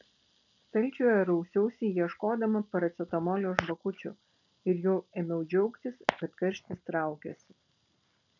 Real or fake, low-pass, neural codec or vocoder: real; 7.2 kHz; none